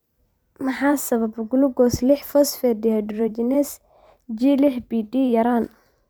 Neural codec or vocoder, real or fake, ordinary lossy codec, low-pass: vocoder, 44.1 kHz, 128 mel bands every 512 samples, BigVGAN v2; fake; none; none